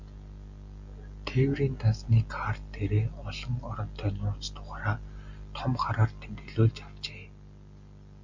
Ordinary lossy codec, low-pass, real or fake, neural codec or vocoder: MP3, 48 kbps; 7.2 kHz; real; none